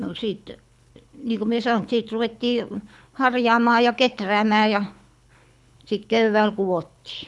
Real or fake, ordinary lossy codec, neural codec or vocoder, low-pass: fake; none; codec, 24 kHz, 6 kbps, HILCodec; none